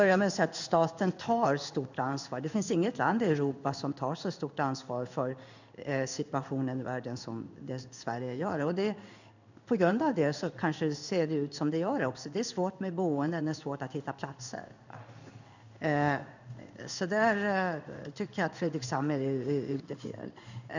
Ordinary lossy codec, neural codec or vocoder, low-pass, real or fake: none; codec, 16 kHz in and 24 kHz out, 1 kbps, XY-Tokenizer; 7.2 kHz; fake